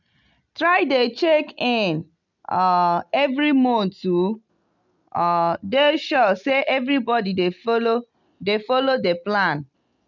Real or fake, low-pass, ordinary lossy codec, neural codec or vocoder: real; 7.2 kHz; none; none